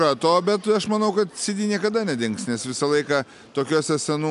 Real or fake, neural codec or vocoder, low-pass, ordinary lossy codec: real; none; 10.8 kHz; AAC, 96 kbps